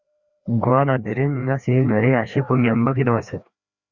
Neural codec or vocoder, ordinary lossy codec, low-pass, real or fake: codec, 16 kHz, 2 kbps, FreqCodec, larger model; Opus, 64 kbps; 7.2 kHz; fake